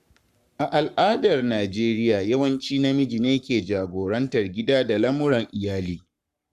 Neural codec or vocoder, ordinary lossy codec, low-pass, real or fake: codec, 44.1 kHz, 7.8 kbps, Pupu-Codec; Opus, 64 kbps; 14.4 kHz; fake